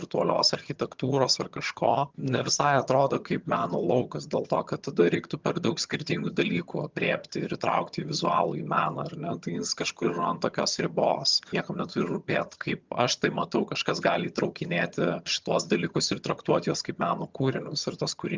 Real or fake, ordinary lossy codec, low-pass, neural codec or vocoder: fake; Opus, 24 kbps; 7.2 kHz; vocoder, 22.05 kHz, 80 mel bands, HiFi-GAN